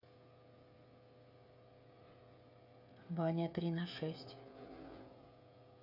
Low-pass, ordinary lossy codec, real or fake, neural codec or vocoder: 5.4 kHz; none; fake; codec, 16 kHz, 16 kbps, FreqCodec, smaller model